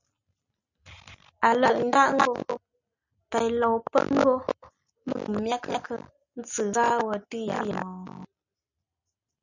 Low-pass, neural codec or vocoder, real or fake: 7.2 kHz; none; real